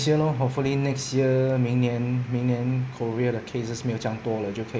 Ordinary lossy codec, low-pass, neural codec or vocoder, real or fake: none; none; none; real